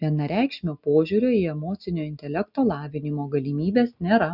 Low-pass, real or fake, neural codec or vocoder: 5.4 kHz; real; none